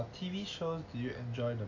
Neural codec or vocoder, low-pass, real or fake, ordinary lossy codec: none; 7.2 kHz; real; AAC, 48 kbps